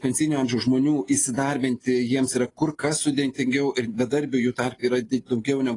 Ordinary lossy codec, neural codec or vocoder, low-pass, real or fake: AAC, 32 kbps; none; 10.8 kHz; real